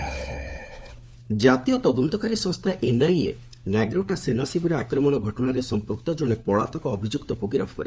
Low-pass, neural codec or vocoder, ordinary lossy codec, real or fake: none; codec, 16 kHz, 4 kbps, FunCodec, trained on LibriTTS, 50 frames a second; none; fake